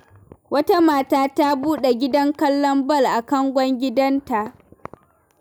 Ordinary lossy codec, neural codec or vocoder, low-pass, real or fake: none; none; none; real